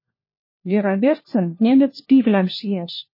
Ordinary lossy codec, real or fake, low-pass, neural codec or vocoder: MP3, 24 kbps; fake; 5.4 kHz; codec, 16 kHz, 1 kbps, FunCodec, trained on LibriTTS, 50 frames a second